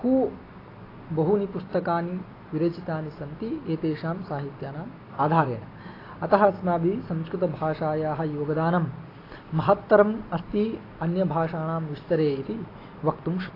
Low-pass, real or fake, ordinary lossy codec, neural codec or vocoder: 5.4 kHz; real; AAC, 24 kbps; none